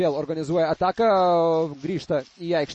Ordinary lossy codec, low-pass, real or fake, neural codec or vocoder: MP3, 32 kbps; 10.8 kHz; real; none